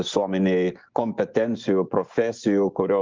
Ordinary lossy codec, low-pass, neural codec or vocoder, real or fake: Opus, 32 kbps; 7.2 kHz; vocoder, 24 kHz, 100 mel bands, Vocos; fake